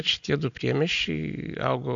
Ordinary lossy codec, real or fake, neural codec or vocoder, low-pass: AAC, 64 kbps; real; none; 7.2 kHz